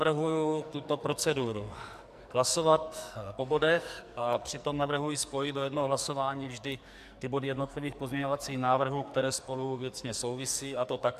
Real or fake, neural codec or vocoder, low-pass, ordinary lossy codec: fake; codec, 44.1 kHz, 2.6 kbps, SNAC; 14.4 kHz; MP3, 96 kbps